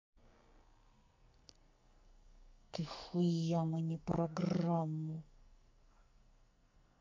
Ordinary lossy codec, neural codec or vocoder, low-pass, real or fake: MP3, 48 kbps; codec, 44.1 kHz, 2.6 kbps, SNAC; 7.2 kHz; fake